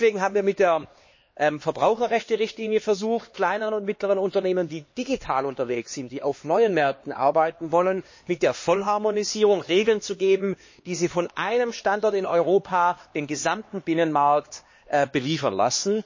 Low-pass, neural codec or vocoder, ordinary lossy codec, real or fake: 7.2 kHz; codec, 16 kHz, 2 kbps, X-Codec, HuBERT features, trained on LibriSpeech; MP3, 32 kbps; fake